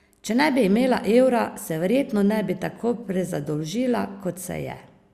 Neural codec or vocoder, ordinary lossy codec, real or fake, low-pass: none; Opus, 64 kbps; real; 14.4 kHz